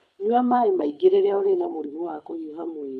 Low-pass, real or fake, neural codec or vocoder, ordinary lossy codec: none; fake; codec, 24 kHz, 6 kbps, HILCodec; none